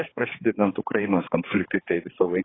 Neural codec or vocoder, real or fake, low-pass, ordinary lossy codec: codec, 16 kHz, 4 kbps, X-Codec, HuBERT features, trained on balanced general audio; fake; 7.2 kHz; AAC, 16 kbps